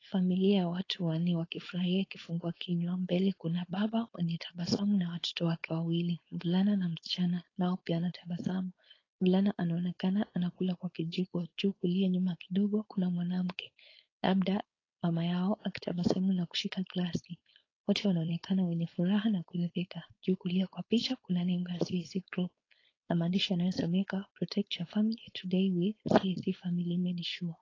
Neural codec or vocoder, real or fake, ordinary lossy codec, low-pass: codec, 16 kHz, 4.8 kbps, FACodec; fake; AAC, 32 kbps; 7.2 kHz